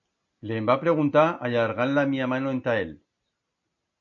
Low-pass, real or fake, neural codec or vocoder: 7.2 kHz; real; none